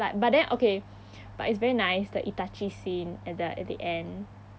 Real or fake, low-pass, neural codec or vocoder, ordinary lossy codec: real; none; none; none